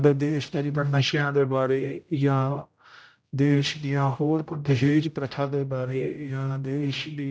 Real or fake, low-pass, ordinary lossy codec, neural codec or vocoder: fake; none; none; codec, 16 kHz, 0.5 kbps, X-Codec, HuBERT features, trained on general audio